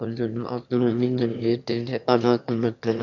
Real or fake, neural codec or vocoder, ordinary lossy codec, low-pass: fake; autoencoder, 22.05 kHz, a latent of 192 numbers a frame, VITS, trained on one speaker; none; 7.2 kHz